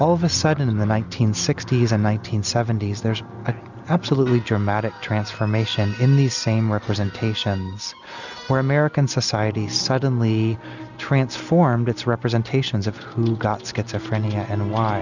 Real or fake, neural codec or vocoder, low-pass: real; none; 7.2 kHz